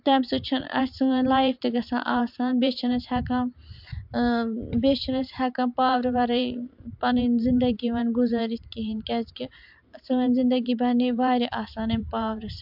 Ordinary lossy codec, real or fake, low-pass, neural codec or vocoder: MP3, 48 kbps; fake; 5.4 kHz; vocoder, 44.1 kHz, 128 mel bands every 512 samples, BigVGAN v2